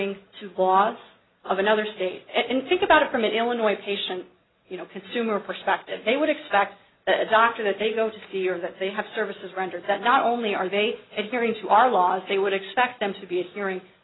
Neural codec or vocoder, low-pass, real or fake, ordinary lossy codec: none; 7.2 kHz; real; AAC, 16 kbps